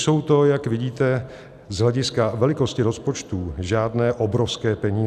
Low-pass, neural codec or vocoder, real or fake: 14.4 kHz; none; real